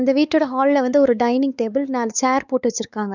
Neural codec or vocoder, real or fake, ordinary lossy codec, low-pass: codec, 16 kHz, 4 kbps, X-Codec, WavLM features, trained on Multilingual LibriSpeech; fake; none; 7.2 kHz